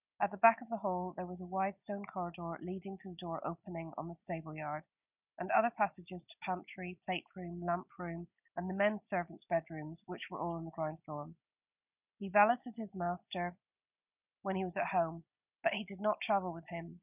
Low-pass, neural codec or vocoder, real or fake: 3.6 kHz; none; real